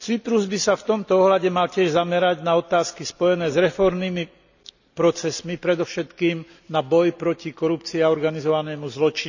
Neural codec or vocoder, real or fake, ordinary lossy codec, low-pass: none; real; none; 7.2 kHz